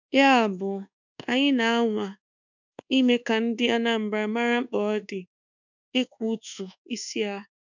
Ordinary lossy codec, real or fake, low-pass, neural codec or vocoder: none; fake; 7.2 kHz; codec, 24 kHz, 1.2 kbps, DualCodec